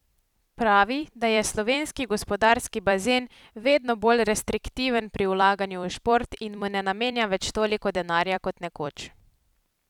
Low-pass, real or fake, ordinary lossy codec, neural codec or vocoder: 19.8 kHz; fake; none; vocoder, 44.1 kHz, 128 mel bands every 256 samples, BigVGAN v2